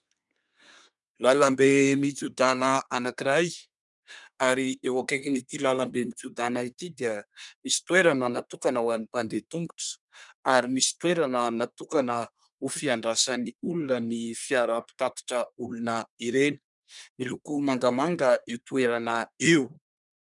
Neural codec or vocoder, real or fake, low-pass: codec, 24 kHz, 1 kbps, SNAC; fake; 10.8 kHz